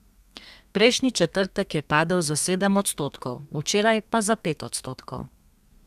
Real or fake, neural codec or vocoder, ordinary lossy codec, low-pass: fake; codec, 32 kHz, 1.9 kbps, SNAC; none; 14.4 kHz